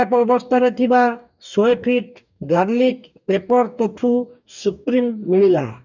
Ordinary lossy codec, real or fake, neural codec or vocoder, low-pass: none; fake; codec, 44.1 kHz, 2.6 kbps, DAC; 7.2 kHz